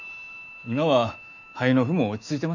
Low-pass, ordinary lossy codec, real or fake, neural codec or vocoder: 7.2 kHz; none; fake; autoencoder, 48 kHz, 128 numbers a frame, DAC-VAE, trained on Japanese speech